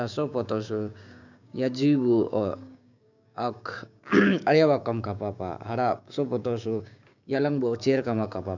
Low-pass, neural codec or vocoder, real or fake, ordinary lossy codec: 7.2 kHz; codec, 16 kHz, 6 kbps, DAC; fake; none